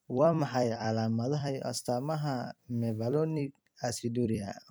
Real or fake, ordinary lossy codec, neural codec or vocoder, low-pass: fake; none; vocoder, 44.1 kHz, 128 mel bands every 256 samples, BigVGAN v2; none